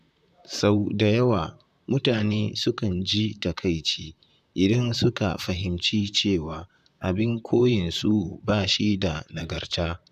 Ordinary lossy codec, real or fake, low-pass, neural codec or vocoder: none; fake; 14.4 kHz; vocoder, 44.1 kHz, 128 mel bands, Pupu-Vocoder